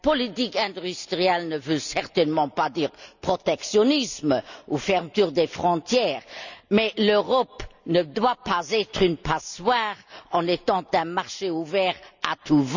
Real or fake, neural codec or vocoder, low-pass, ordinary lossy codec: real; none; 7.2 kHz; none